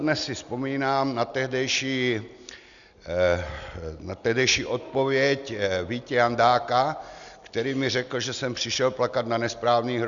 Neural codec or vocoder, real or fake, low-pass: none; real; 7.2 kHz